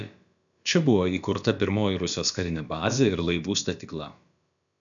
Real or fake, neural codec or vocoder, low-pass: fake; codec, 16 kHz, about 1 kbps, DyCAST, with the encoder's durations; 7.2 kHz